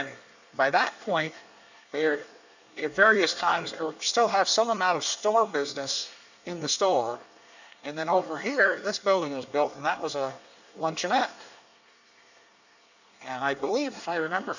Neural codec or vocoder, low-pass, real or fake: codec, 24 kHz, 1 kbps, SNAC; 7.2 kHz; fake